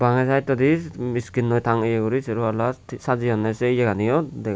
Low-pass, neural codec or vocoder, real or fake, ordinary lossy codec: none; none; real; none